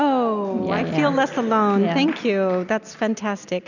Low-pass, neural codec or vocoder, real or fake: 7.2 kHz; none; real